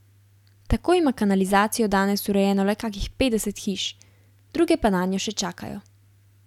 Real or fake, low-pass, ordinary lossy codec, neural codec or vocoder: real; 19.8 kHz; none; none